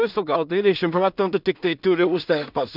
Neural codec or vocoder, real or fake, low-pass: codec, 16 kHz in and 24 kHz out, 0.4 kbps, LongCat-Audio-Codec, two codebook decoder; fake; 5.4 kHz